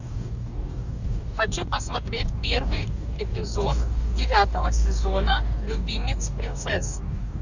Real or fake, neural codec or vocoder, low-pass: fake; codec, 44.1 kHz, 2.6 kbps, DAC; 7.2 kHz